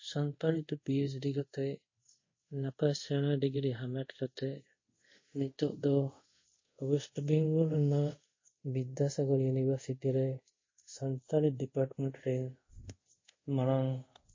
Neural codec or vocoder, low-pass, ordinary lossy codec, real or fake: codec, 24 kHz, 0.5 kbps, DualCodec; 7.2 kHz; MP3, 32 kbps; fake